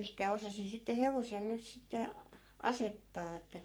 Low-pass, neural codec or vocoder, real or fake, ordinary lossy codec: none; codec, 44.1 kHz, 3.4 kbps, Pupu-Codec; fake; none